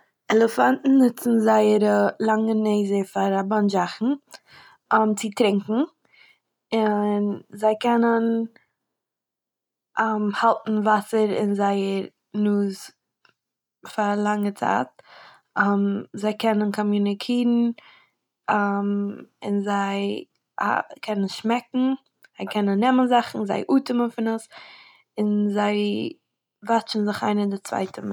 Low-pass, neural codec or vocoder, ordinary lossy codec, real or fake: 19.8 kHz; none; none; real